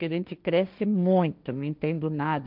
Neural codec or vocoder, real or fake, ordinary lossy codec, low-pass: codec, 16 kHz, 1.1 kbps, Voila-Tokenizer; fake; none; 5.4 kHz